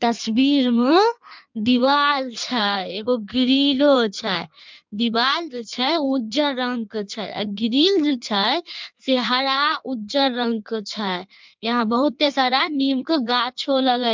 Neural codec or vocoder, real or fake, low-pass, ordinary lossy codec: codec, 16 kHz in and 24 kHz out, 1.1 kbps, FireRedTTS-2 codec; fake; 7.2 kHz; MP3, 64 kbps